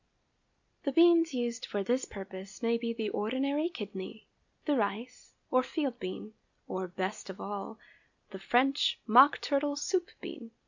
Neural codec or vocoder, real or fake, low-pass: none; real; 7.2 kHz